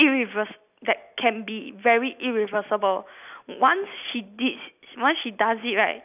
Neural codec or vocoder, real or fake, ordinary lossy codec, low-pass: none; real; none; 3.6 kHz